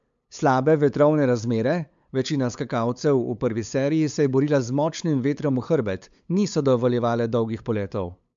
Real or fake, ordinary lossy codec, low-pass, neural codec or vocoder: fake; MP3, 64 kbps; 7.2 kHz; codec, 16 kHz, 8 kbps, FunCodec, trained on LibriTTS, 25 frames a second